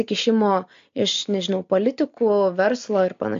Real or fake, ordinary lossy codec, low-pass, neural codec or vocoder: real; MP3, 48 kbps; 7.2 kHz; none